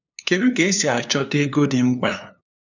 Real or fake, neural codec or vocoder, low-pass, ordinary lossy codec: fake; codec, 16 kHz, 2 kbps, FunCodec, trained on LibriTTS, 25 frames a second; 7.2 kHz; none